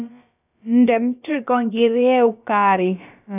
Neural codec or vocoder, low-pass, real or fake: codec, 16 kHz, about 1 kbps, DyCAST, with the encoder's durations; 3.6 kHz; fake